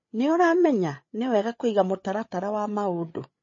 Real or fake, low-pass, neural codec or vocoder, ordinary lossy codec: fake; 7.2 kHz; codec, 16 kHz, 8 kbps, FreqCodec, larger model; MP3, 32 kbps